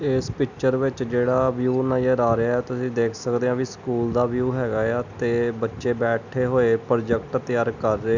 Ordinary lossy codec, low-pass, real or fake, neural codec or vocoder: none; 7.2 kHz; real; none